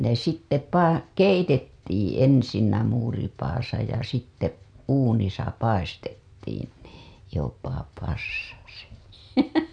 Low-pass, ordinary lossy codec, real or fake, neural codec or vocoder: 9.9 kHz; none; real; none